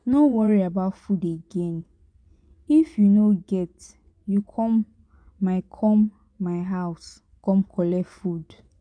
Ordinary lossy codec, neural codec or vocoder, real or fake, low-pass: none; vocoder, 24 kHz, 100 mel bands, Vocos; fake; 9.9 kHz